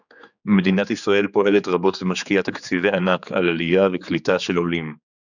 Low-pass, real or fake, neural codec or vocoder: 7.2 kHz; fake; codec, 16 kHz, 4 kbps, X-Codec, HuBERT features, trained on general audio